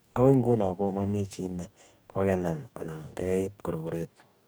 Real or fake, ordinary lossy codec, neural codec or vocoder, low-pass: fake; none; codec, 44.1 kHz, 2.6 kbps, DAC; none